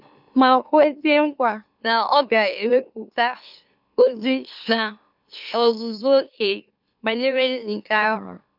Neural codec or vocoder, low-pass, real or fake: autoencoder, 44.1 kHz, a latent of 192 numbers a frame, MeloTTS; 5.4 kHz; fake